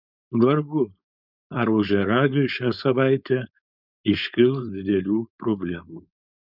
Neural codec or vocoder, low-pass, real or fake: codec, 16 kHz, 4.8 kbps, FACodec; 5.4 kHz; fake